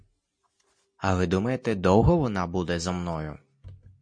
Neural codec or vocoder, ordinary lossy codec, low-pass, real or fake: none; MP3, 48 kbps; 9.9 kHz; real